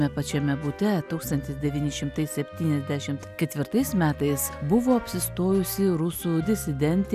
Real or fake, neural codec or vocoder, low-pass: real; none; 14.4 kHz